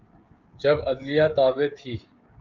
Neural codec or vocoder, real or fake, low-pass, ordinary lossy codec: codec, 16 kHz, 16 kbps, FreqCodec, smaller model; fake; 7.2 kHz; Opus, 32 kbps